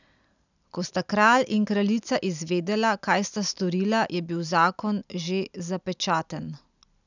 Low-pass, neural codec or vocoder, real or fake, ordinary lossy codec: 7.2 kHz; none; real; none